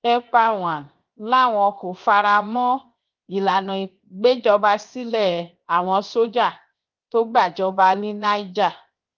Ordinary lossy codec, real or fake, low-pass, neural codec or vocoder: Opus, 32 kbps; fake; 7.2 kHz; codec, 16 kHz, 0.7 kbps, FocalCodec